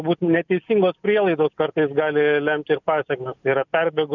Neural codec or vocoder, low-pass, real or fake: none; 7.2 kHz; real